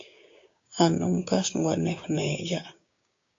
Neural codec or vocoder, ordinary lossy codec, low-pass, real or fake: codec, 16 kHz, 6 kbps, DAC; AAC, 48 kbps; 7.2 kHz; fake